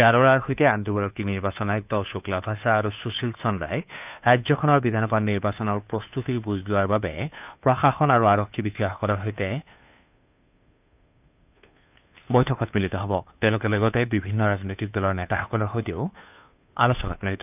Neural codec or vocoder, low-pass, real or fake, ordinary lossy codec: codec, 16 kHz, 2 kbps, FunCodec, trained on Chinese and English, 25 frames a second; 3.6 kHz; fake; none